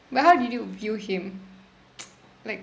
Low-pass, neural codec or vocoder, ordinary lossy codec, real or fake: none; none; none; real